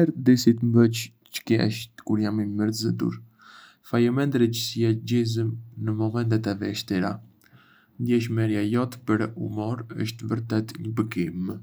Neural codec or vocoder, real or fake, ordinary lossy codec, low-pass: none; real; none; none